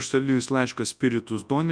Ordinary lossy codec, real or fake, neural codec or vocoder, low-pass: MP3, 96 kbps; fake; codec, 24 kHz, 0.9 kbps, WavTokenizer, large speech release; 9.9 kHz